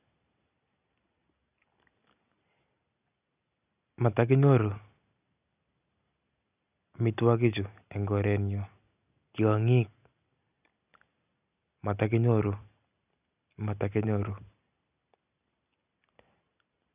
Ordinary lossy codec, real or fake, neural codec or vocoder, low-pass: none; real; none; 3.6 kHz